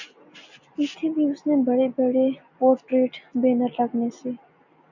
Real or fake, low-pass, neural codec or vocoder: real; 7.2 kHz; none